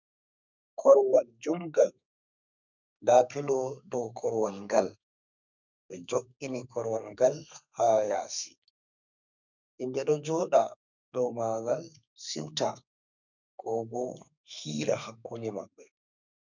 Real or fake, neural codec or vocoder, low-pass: fake; codec, 32 kHz, 1.9 kbps, SNAC; 7.2 kHz